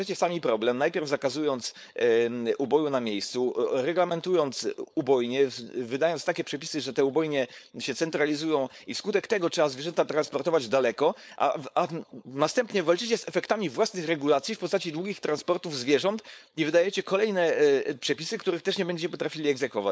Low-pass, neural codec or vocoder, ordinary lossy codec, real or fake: none; codec, 16 kHz, 4.8 kbps, FACodec; none; fake